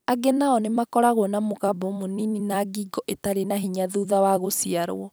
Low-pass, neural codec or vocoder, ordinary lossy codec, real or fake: none; vocoder, 44.1 kHz, 128 mel bands every 256 samples, BigVGAN v2; none; fake